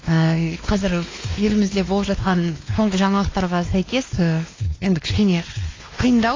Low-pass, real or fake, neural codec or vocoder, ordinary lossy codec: 7.2 kHz; fake; codec, 16 kHz, 2 kbps, X-Codec, WavLM features, trained on Multilingual LibriSpeech; AAC, 32 kbps